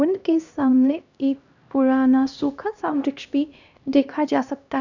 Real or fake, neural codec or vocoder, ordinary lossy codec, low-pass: fake; codec, 16 kHz, 1 kbps, X-Codec, HuBERT features, trained on LibriSpeech; none; 7.2 kHz